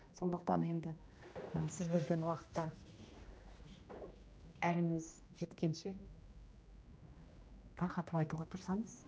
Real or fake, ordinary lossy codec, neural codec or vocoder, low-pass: fake; none; codec, 16 kHz, 1 kbps, X-Codec, HuBERT features, trained on general audio; none